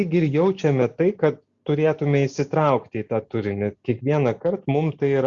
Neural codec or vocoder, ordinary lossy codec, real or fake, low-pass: none; Opus, 64 kbps; real; 7.2 kHz